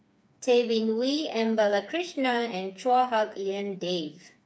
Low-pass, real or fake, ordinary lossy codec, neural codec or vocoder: none; fake; none; codec, 16 kHz, 4 kbps, FreqCodec, smaller model